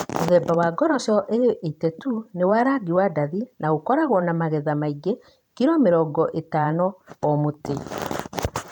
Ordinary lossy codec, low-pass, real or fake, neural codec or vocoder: none; none; fake; vocoder, 44.1 kHz, 128 mel bands every 512 samples, BigVGAN v2